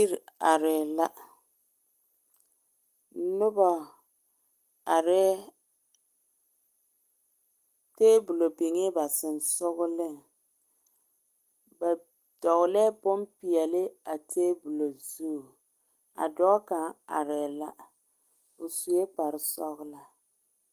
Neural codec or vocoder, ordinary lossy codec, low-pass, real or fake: none; Opus, 24 kbps; 14.4 kHz; real